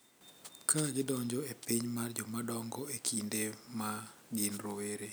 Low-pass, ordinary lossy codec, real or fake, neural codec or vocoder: none; none; real; none